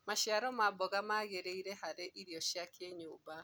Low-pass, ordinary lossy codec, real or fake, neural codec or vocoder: none; none; real; none